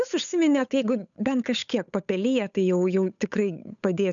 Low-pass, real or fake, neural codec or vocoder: 7.2 kHz; fake; codec, 16 kHz, 8 kbps, FunCodec, trained on Chinese and English, 25 frames a second